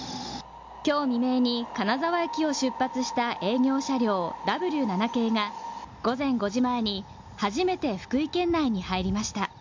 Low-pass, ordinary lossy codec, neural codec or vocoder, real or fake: 7.2 kHz; MP3, 64 kbps; none; real